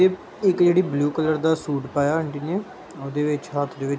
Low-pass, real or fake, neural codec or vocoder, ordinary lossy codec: none; real; none; none